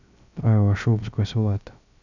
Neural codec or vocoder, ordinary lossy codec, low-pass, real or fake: codec, 16 kHz, 0.3 kbps, FocalCodec; none; 7.2 kHz; fake